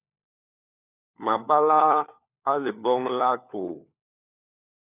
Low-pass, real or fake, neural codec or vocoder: 3.6 kHz; fake; codec, 16 kHz, 4 kbps, FunCodec, trained on LibriTTS, 50 frames a second